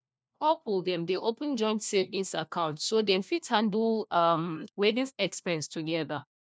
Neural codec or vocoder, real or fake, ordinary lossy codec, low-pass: codec, 16 kHz, 1 kbps, FunCodec, trained on LibriTTS, 50 frames a second; fake; none; none